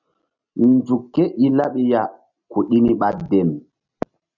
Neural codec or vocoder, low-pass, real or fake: none; 7.2 kHz; real